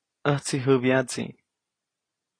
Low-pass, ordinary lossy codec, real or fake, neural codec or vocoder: 9.9 kHz; AAC, 32 kbps; real; none